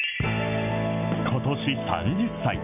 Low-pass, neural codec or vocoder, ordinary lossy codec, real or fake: 3.6 kHz; none; none; real